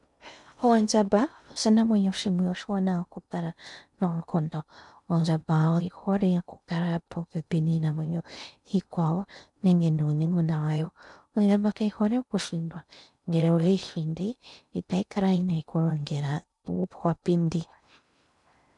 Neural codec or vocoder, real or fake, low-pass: codec, 16 kHz in and 24 kHz out, 0.6 kbps, FocalCodec, streaming, 2048 codes; fake; 10.8 kHz